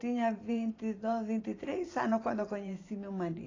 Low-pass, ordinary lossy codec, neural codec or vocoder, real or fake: 7.2 kHz; AAC, 32 kbps; vocoder, 44.1 kHz, 128 mel bands every 512 samples, BigVGAN v2; fake